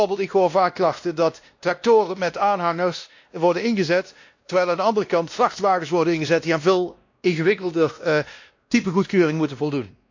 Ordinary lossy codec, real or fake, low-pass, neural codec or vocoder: AAC, 48 kbps; fake; 7.2 kHz; codec, 16 kHz, 1 kbps, X-Codec, WavLM features, trained on Multilingual LibriSpeech